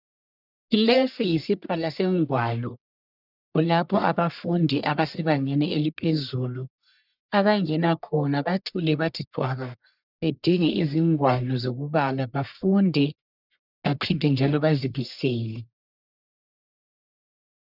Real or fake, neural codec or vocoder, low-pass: fake; codec, 44.1 kHz, 1.7 kbps, Pupu-Codec; 5.4 kHz